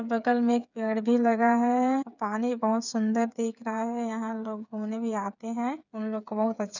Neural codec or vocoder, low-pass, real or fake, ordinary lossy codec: codec, 16 kHz, 8 kbps, FreqCodec, smaller model; 7.2 kHz; fake; none